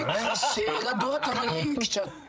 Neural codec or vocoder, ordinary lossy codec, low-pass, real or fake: codec, 16 kHz, 16 kbps, FreqCodec, larger model; none; none; fake